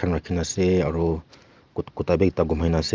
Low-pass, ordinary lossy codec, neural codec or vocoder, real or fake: 7.2 kHz; Opus, 32 kbps; none; real